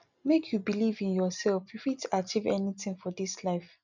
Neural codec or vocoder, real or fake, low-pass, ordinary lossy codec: none; real; 7.2 kHz; none